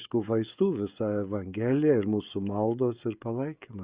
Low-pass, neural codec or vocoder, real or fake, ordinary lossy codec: 3.6 kHz; codec, 16 kHz, 16 kbps, FreqCodec, smaller model; fake; Opus, 64 kbps